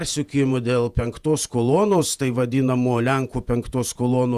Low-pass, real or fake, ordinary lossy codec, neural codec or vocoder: 14.4 kHz; fake; Opus, 64 kbps; vocoder, 48 kHz, 128 mel bands, Vocos